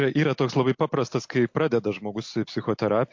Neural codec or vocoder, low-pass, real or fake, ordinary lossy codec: none; 7.2 kHz; real; MP3, 48 kbps